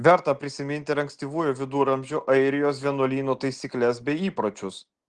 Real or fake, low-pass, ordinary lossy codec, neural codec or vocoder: real; 9.9 kHz; Opus, 16 kbps; none